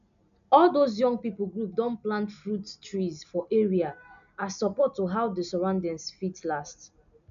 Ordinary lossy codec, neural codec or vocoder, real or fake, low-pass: none; none; real; 7.2 kHz